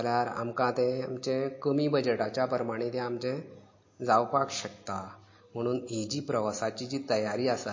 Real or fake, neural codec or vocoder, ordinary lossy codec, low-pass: real; none; MP3, 32 kbps; 7.2 kHz